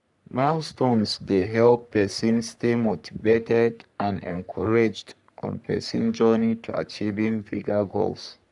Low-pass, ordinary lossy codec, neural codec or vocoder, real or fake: 10.8 kHz; none; codec, 44.1 kHz, 3.4 kbps, Pupu-Codec; fake